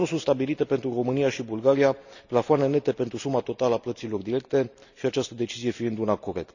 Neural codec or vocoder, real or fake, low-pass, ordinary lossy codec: none; real; 7.2 kHz; none